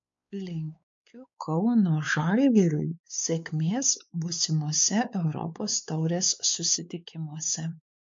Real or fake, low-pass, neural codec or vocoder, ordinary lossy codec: fake; 7.2 kHz; codec, 16 kHz, 4 kbps, X-Codec, WavLM features, trained on Multilingual LibriSpeech; MP3, 48 kbps